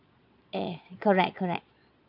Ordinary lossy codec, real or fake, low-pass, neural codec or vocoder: none; fake; 5.4 kHz; vocoder, 22.05 kHz, 80 mel bands, Vocos